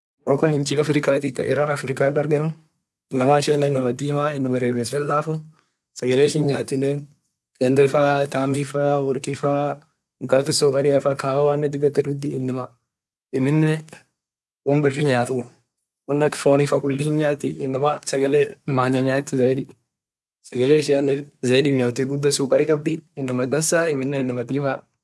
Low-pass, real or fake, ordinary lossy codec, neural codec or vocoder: none; fake; none; codec, 24 kHz, 1 kbps, SNAC